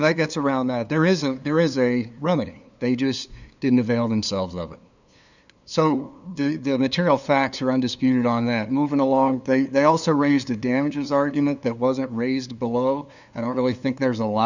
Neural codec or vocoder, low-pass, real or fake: codec, 16 kHz, 2 kbps, FunCodec, trained on LibriTTS, 25 frames a second; 7.2 kHz; fake